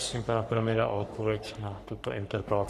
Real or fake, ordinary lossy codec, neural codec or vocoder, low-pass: fake; Opus, 64 kbps; codec, 44.1 kHz, 3.4 kbps, Pupu-Codec; 14.4 kHz